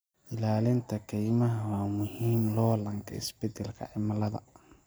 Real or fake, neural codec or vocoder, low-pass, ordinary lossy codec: real; none; none; none